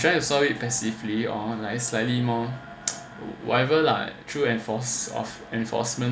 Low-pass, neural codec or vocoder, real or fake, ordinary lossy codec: none; none; real; none